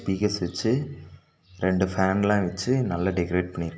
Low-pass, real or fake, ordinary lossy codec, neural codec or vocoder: none; real; none; none